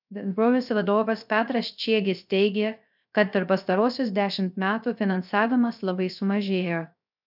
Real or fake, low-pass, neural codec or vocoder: fake; 5.4 kHz; codec, 16 kHz, 0.3 kbps, FocalCodec